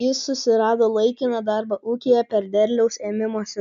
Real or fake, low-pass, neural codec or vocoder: real; 7.2 kHz; none